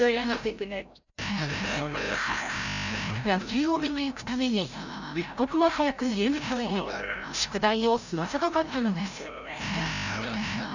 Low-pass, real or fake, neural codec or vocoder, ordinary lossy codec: 7.2 kHz; fake; codec, 16 kHz, 0.5 kbps, FreqCodec, larger model; none